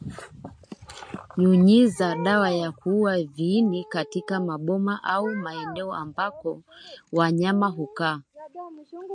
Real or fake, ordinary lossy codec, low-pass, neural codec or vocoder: real; MP3, 48 kbps; 9.9 kHz; none